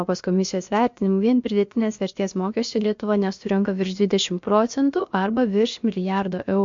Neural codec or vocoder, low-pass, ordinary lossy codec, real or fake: codec, 16 kHz, about 1 kbps, DyCAST, with the encoder's durations; 7.2 kHz; MP3, 48 kbps; fake